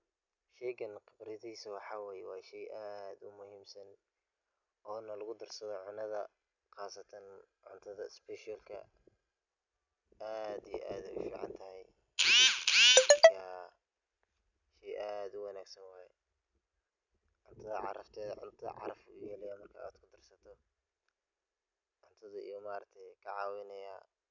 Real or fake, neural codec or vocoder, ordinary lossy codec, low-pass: real; none; none; 7.2 kHz